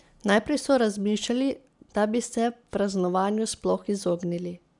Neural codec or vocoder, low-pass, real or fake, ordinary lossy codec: none; 10.8 kHz; real; none